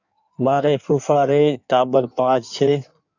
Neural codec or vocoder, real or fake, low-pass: codec, 16 kHz in and 24 kHz out, 1.1 kbps, FireRedTTS-2 codec; fake; 7.2 kHz